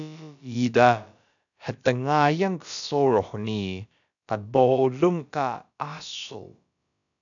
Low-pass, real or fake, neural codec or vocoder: 7.2 kHz; fake; codec, 16 kHz, about 1 kbps, DyCAST, with the encoder's durations